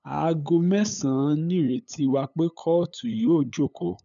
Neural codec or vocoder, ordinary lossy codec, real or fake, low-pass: codec, 16 kHz, 8 kbps, FunCodec, trained on LibriTTS, 25 frames a second; none; fake; 7.2 kHz